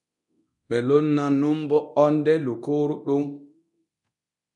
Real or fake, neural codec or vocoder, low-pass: fake; codec, 24 kHz, 0.9 kbps, DualCodec; 10.8 kHz